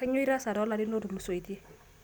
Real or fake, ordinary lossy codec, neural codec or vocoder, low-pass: real; none; none; none